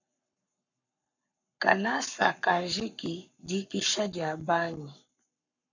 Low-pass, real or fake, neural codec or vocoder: 7.2 kHz; fake; codec, 44.1 kHz, 7.8 kbps, Pupu-Codec